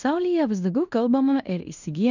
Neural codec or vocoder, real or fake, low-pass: codec, 16 kHz in and 24 kHz out, 0.9 kbps, LongCat-Audio-Codec, fine tuned four codebook decoder; fake; 7.2 kHz